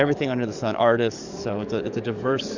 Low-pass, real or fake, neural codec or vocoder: 7.2 kHz; fake; codec, 44.1 kHz, 7.8 kbps, DAC